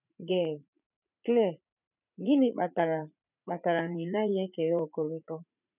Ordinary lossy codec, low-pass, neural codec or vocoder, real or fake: none; 3.6 kHz; vocoder, 44.1 kHz, 80 mel bands, Vocos; fake